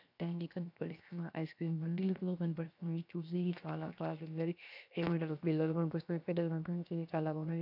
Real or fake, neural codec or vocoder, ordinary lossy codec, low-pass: fake; codec, 16 kHz, 0.7 kbps, FocalCodec; none; 5.4 kHz